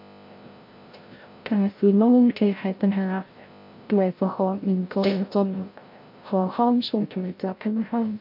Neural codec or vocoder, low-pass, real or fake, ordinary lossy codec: codec, 16 kHz, 0.5 kbps, FreqCodec, larger model; 5.4 kHz; fake; MP3, 32 kbps